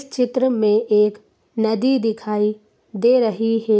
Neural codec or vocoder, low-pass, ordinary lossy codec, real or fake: none; none; none; real